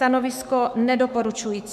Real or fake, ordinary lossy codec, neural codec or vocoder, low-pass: fake; MP3, 96 kbps; autoencoder, 48 kHz, 128 numbers a frame, DAC-VAE, trained on Japanese speech; 14.4 kHz